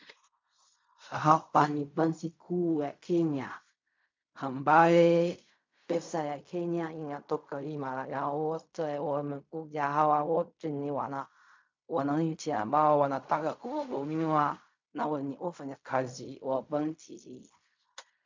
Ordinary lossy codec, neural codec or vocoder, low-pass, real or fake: MP3, 64 kbps; codec, 16 kHz in and 24 kHz out, 0.4 kbps, LongCat-Audio-Codec, fine tuned four codebook decoder; 7.2 kHz; fake